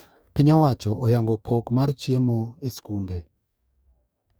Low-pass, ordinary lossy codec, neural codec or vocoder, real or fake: none; none; codec, 44.1 kHz, 2.6 kbps, DAC; fake